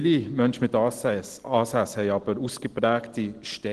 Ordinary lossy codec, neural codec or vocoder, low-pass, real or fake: Opus, 16 kbps; none; 10.8 kHz; real